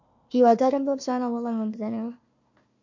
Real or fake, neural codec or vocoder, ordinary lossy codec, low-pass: fake; codec, 16 kHz, 1 kbps, FunCodec, trained on Chinese and English, 50 frames a second; MP3, 48 kbps; 7.2 kHz